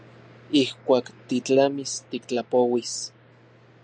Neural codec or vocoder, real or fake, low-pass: none; real; 9.9 kHz